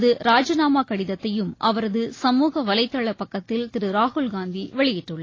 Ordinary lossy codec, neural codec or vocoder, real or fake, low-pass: AAC, 32 kbps; none; real; 7.2 kHz